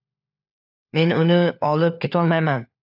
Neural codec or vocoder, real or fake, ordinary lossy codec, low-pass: codec, 16 kHz, 4 kbps, FunCodec, trained on LibriTTS, 50 frames a second; fake; AAC, 48 kbps; 5.4 kHz